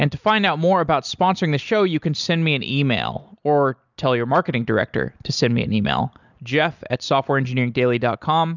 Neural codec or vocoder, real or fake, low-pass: none; real; 7.2 kHz